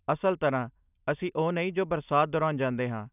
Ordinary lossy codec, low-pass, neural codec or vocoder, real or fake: none; 3.6 kHz; none; real